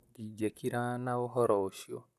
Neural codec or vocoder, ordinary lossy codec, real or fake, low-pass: vocoder, 44.1 kHz, 128 mel bands, Pupu-Vocoder; none; fake; 14.4 kHz